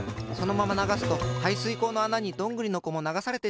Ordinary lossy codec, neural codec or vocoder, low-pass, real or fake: none; none; none; real